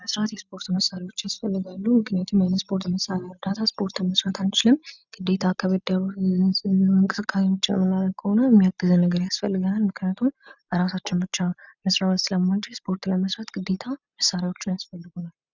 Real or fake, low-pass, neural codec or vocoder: real; 7.2 kHz; none